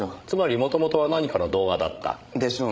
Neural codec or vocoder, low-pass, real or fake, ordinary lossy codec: codec, 16 kHz, 16 kbps, FreqCodec, larger model; none; fake; none